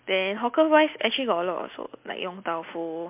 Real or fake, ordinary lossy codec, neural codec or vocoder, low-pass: real; MP3, 32 kbps; none; 3.6 kHz